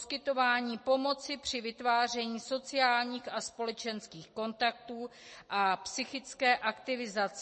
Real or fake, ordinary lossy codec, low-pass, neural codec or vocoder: real; MP3, 32 kbps; 9.9 kHz; none